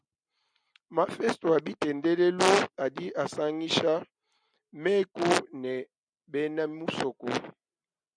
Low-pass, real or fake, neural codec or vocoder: 9.9 kHz; real; none